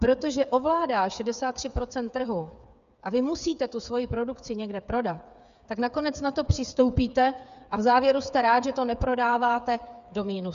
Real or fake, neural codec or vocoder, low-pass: fake; codec, 16 kHz, 8 kbps, FreqCodec, smaller model; 7.2 kHz